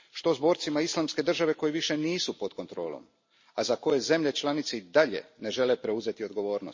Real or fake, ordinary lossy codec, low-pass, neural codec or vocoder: real; MP3, 32 kbps; 7.2 kHz; none